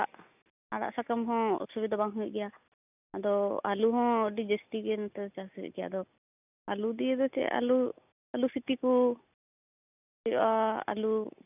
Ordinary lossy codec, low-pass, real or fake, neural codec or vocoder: none; 3.6 kHz; real; none